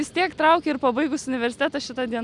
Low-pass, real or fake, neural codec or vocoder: 10.8 kHz; real; none